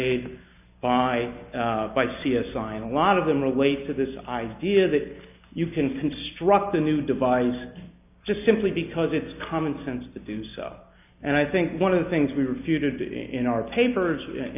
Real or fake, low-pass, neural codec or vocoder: real; 3.6 kHz; none